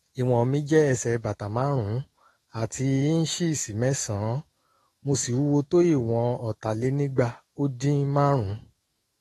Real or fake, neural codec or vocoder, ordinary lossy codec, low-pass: fake; autoencoder, 48 kHz, 128 numbers a frame, DAC-VAE, trained on Japanese speech; AAC, 32 kbps; 19.8 kHz